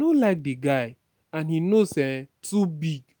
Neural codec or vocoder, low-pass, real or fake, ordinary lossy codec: none; none; real; none